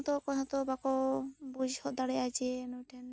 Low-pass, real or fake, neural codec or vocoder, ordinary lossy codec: none; real; none; none